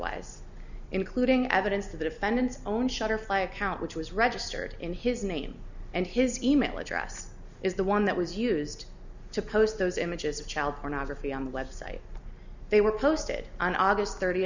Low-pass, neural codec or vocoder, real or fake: 7.2 kHz; none; real